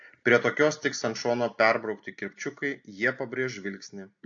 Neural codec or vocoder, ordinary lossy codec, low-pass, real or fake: none; AAC, 48 kbps; 7.2 kHz; real